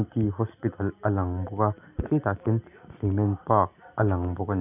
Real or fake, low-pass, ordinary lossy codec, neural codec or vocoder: real; 3.6 kHz; none; none